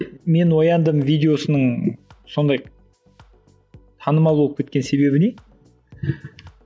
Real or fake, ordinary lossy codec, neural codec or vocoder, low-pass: real; none; none; none